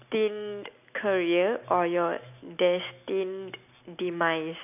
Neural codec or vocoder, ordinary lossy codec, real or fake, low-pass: none; none; real; 3.6 kHz